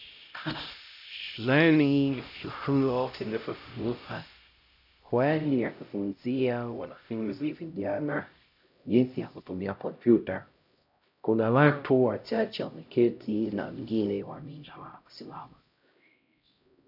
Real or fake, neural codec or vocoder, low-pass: fake; codec, 16 kHz, 0.5 kbps, X-Codec, HuBERT features, trained on LibriSpeech; 5.4 kHz